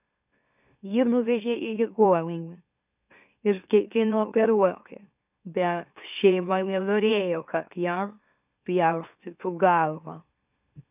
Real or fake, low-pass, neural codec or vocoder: fake; 3.6 kHz; autoencoder, 44.1 kHz, a latent of 192 numbers a frame, MeloTTS